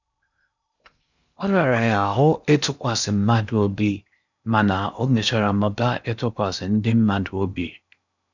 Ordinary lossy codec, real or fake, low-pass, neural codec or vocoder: none; fake; 7.2 kHz; codec, 16 kHz in and 24 kHz out, 0.6 kbps, FocalCodec, streaming, 2048 codes